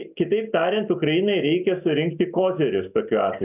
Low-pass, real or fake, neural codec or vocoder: 3.6 kHz; real; none